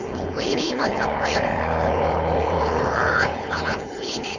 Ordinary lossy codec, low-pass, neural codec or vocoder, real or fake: none; 7.2 kHz; codec, 16 kHz, 4.8 kbps, FACodec; fake